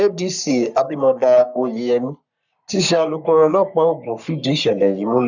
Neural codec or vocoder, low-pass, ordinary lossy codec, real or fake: codec, 44.1 kHz, 3.4 kbps, Pupu-Codec; 7.2 kHz; none; fake